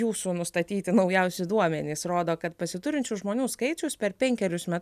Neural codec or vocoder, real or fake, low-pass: none; real; 14.4 kHz